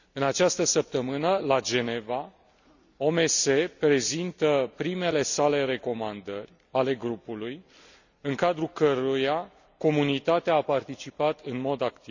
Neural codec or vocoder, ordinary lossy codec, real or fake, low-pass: none; none; real; 7.2 kHz